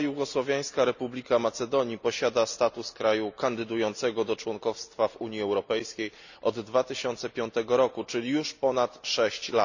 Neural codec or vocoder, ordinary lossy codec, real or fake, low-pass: none; none; real; 7.2 kHz